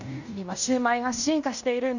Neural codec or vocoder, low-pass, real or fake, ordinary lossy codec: codec, 16 kHz in and 24 kHz out, 0.9 kbps, LongCat-Audio-Codec, fine tuned four codebook decoder; 7.2 kHz; fake; none